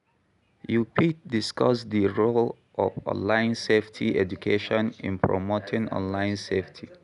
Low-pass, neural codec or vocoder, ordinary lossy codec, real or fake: 10.8 kHz; none; none; real